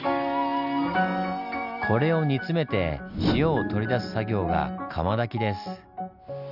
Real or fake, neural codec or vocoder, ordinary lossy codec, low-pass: real; none; none; 5.4 kHz